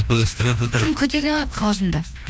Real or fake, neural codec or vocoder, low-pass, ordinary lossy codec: fake; codec, 16 kHz, 1 kbps, FreqCodec, larger model; none; none